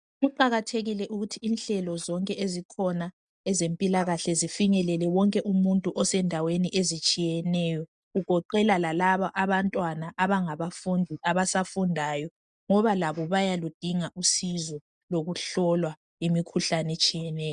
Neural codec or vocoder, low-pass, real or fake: none; 9.9 kHz; real